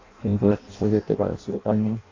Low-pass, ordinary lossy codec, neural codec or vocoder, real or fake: 7.2 kHz; AAC, 32 kbps; codec, 16 kHz in and 24 kHz out, 0.6 kbps, FireRedTTS-2 codec; fake